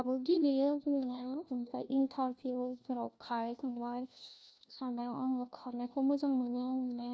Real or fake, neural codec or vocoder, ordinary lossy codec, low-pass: fake; codec, 16 kHz, 1 kbps, FunCodec, trained on LibriTTS, 50 frames a second; none; none